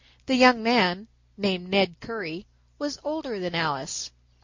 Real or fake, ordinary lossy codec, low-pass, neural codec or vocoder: real; MP3, 48 kbps; 7.2 kHz; none